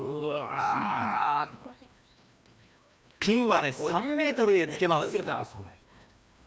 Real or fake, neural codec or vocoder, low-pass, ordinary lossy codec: fake; codec, 16 kHz, 1 kbps, FreqCodec, larger model; none; none